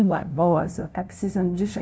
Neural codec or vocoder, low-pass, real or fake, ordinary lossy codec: codec, 16 kHz, 0.5 kbps, FunCodec, trained on LibriTTS, 25 frames a second; none; fake; none